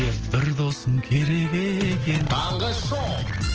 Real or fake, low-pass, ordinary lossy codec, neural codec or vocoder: real; 7.2 kHz; Opus, 16 kbps; none